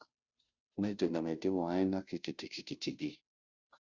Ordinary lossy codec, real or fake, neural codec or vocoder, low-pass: Opus, 64 kbps; fake; codec, 16 kHz, 0.5 kbps, FunCodec, trained on Chinese and English, 25 frames a second; 7.2 kHz